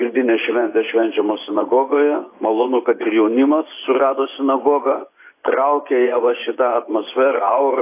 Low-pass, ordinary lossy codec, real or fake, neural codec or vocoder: 3.6 kHz; AAC, 24 kbps; fake; vocoder, 24 kHz, 100 mel bands, Vocos